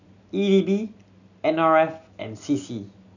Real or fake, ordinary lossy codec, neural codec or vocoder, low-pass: real; none; none; 7.2 kHz